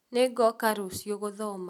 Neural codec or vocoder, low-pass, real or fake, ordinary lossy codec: none; 19.8 kHz; real; none